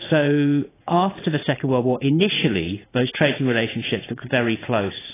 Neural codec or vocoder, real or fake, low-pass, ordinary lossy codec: vocoder, 44.1 kHz, 80 mel bands, Vocos; fake; 3.6 kHz; AAC, 16 kbps